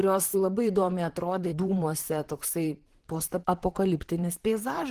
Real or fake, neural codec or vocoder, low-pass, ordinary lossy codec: fake; vocoder, 44.1 kHz, 128 mel bands, Pupu-Vocoder; 14.4 kHz; Opus, 16 kbps